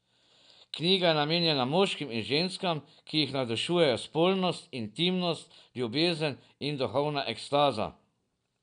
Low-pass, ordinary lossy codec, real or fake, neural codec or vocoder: 9.9 kHz; none; real; none